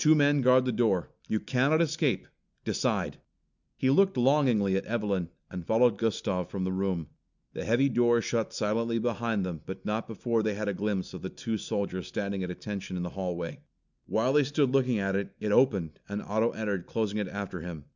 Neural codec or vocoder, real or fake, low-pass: none; real; 7.2 kHz